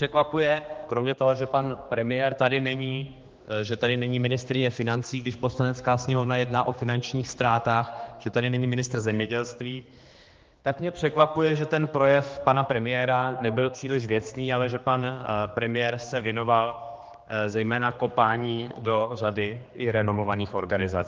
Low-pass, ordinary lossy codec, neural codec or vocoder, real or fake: 7.2 kHz; Opus, 24 kbps; codec, 16 kHz, 2 kbps, X-Codec, HuBERT features, trained on general audio; fake